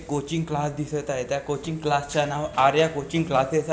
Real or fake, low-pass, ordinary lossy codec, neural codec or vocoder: real; none; none; none